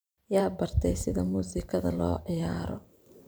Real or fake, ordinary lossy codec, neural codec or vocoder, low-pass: fake; none; vocoder, 44.1 kHz, 128 mel bands every 512 samples, BigVGAN v2; none